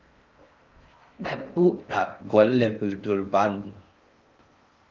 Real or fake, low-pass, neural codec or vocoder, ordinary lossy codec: fake; 7.2 kHz; codec, 16 kHz in and 24 kHz out, 0.6 kbps, FocalCodec, streaming, 4096 codes; Opus, 24 kbps